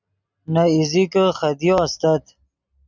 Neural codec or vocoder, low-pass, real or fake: none; 7.2 kHz; real